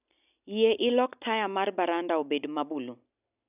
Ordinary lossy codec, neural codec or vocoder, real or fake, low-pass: none; none; real; 3.6 kHz